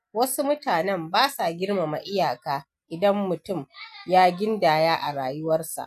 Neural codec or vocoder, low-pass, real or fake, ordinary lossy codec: none; 14.4 kHz; real; none